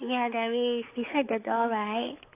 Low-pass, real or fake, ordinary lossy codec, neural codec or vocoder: 3.6 kHz; fake; AAC, 24 kbps; codec, 16 kHz, 4 kbps, X-Codec, HuBERT features, trained on balanced general audio